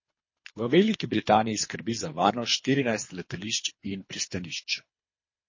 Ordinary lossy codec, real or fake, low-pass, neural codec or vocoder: MP3, 32 kbps; fake; 7.2 kHz; codec, 24 kHz, 3 kbps, HILCodec